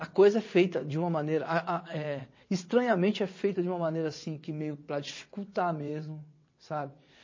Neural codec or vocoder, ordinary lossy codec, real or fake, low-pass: vocoder, 22.05 kHz, 80 mel bands, WaveNeXt; MP3, 32 kbps; fake; 7.2 kHz